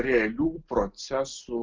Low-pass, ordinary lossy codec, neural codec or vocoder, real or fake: 7.2 kHz; Opus, 24 kbps; none; real